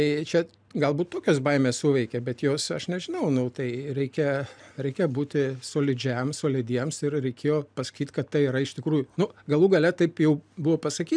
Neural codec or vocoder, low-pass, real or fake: none; 9.9 kHz; real